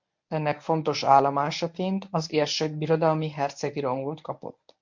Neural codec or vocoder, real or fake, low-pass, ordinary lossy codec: codec, 24 kHz, 0.9 kbps, WavTokenizer, medium speech release version 1; fake; 7.2 kHz; MP3, 48 kbps